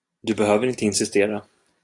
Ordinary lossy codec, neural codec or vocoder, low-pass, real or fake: AAC, 64 kbps; none; 10.8 kHz; real